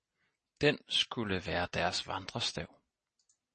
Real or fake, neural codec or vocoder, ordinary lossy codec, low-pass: real; none; MP3, 32 kbps; 9.9 kHz